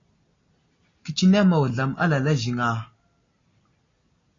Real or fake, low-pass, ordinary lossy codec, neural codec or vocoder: real; 7.2 kHz; AAC, 32 kbps; none